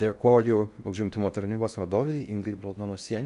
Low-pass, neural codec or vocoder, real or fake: 10.8 kHz; codec, 16 kHz in and 24 kHz out, 0.6 kbps, FocalCodec, streaming, 4096 codes; fake